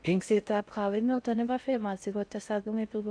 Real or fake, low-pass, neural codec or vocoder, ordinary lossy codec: fake; 9.9 kHz; codec, 16 kHz in and 24 kHz out, 0.6 kbps, FocalCodec, streaming, 4096 codes; MP3, 96 kbps